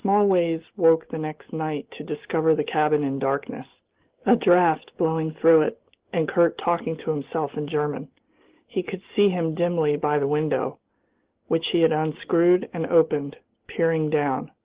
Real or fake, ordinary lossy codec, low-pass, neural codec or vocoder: real; Opus, 16 kbps; 3.6 kHz; none